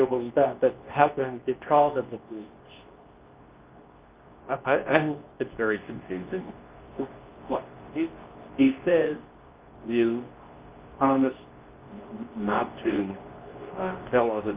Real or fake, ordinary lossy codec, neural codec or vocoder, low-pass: fake; Opus, 32 kbps; codec, 24 kHz, 0.9 kbps, WavTokenizer, medium speech release version 2; 3.6 kHz